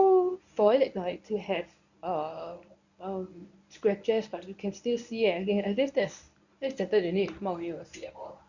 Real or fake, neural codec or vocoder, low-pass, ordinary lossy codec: fake; codec, 24 kHz, 0.9 kbps, WavTokenizer, medium speech release version 1; 7.2 kHz; none